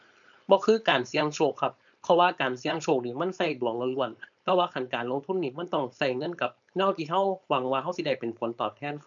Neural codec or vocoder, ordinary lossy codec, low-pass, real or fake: codec, 16 kHz, 4.8 kbps, FACodec; none; 7.2 kHz; fake